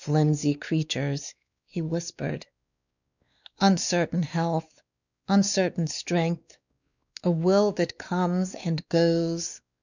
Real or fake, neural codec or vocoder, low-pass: fake; codec, 16 kHz, 2 kbps, X-Codec, WavLM features, trained on Multilingual LibriSpeech; 7.2 kHz